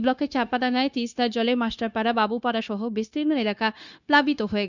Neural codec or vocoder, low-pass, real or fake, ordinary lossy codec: codec, 16 kHz, 0.9 kbps, LongCat-Audio-Codec; 7.2 kHz; fake; none